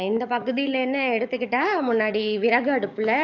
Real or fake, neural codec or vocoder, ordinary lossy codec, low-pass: fake; codec, 44.1 kHz, 7.8 kbps, DAC; none; 7.2 kHz